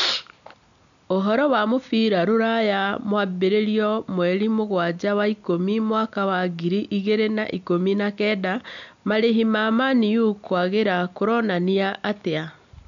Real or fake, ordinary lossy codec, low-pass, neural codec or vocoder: real; none; 7.2 kHz; none